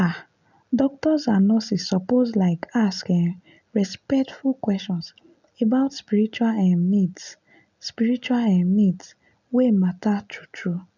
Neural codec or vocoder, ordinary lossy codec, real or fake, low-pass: none; none; real; 7.2 kHz